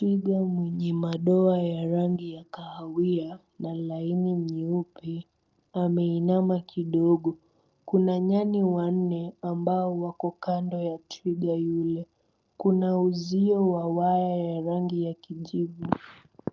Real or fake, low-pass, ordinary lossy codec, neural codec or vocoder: real; 7.2 kHz; Opus, 24 kbps; none